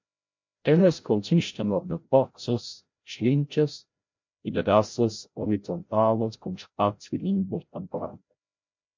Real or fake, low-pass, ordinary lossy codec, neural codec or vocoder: fake; 7.2 kHz; MP3, 48 kbps; codec, 16 kHz, 0.5 kbps, FreqCodec, larger model